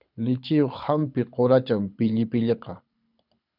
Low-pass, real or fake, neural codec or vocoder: 5.4 kHz; fake; codec, 24 kHz, 6 kbps, HILCodec